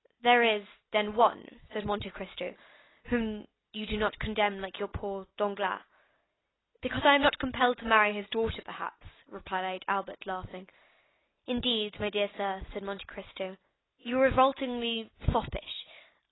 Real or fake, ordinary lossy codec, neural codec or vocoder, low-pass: real; AAC, 16 kbps; none; 7.2 kHz